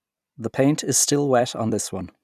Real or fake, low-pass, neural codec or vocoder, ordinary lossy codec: fake; 14.4 kHz; vocoder, 44.1 kHz, 128 mel bands every 256 samples, BigVGAN v2; none